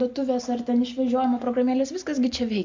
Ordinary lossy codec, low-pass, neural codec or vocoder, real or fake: MP3, 64 kbps; 7.2 kHz; none; real